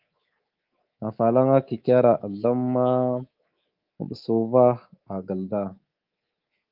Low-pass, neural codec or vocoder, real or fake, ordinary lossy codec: 5.4 kHz; codec, 24 kHz, 3.1 kbps, DualCodec; fake; Opus, 32 kbps